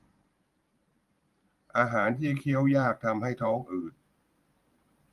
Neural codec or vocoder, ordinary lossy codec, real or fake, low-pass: vocoder, 44.1 kHz, 128 mel bands every 512 samples, BigVGAN v2; Opus, 32 kbps; fake; 14.4 kHz